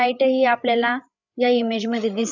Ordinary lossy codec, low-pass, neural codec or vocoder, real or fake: none; 7.2 kHz; codec, 16 kHz, 8 kbps, FreqCodec, larger model; fake